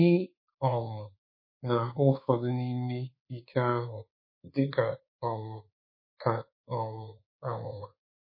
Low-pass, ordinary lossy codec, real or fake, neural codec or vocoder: 5.4 kHz; MP3, 24 kbps; fake; codec, 24 kHz, 1.2 kbps, DualCodec